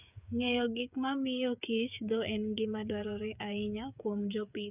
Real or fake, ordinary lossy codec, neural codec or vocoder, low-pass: fake; none; codec, 16 kHz, 8 kbps, FreqCodec, smaller model; 3.6 kHz